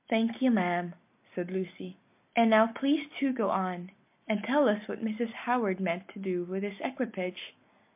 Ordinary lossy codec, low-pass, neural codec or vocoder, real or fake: MP3, 32 kbps; 3.6 kHz; none; real